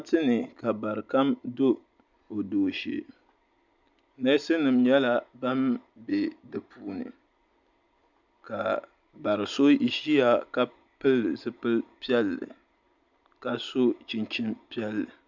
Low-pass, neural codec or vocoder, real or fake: 7.2 kHz; none; real